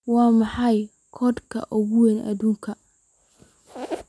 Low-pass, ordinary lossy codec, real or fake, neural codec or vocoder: none; none; real; none